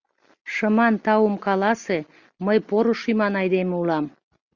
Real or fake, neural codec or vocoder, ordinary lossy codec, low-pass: real; none; Opus, 64 kbps; 7.2 kHz